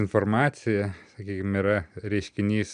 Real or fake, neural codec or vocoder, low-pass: real; none; 9.9 kHz